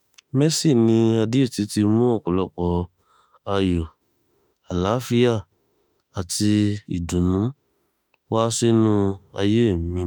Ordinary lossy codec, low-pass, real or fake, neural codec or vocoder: none; none; fake; autoencoder, 48 kHz, 32 numbers a frame, DAC-VAE, trained on Japanese speech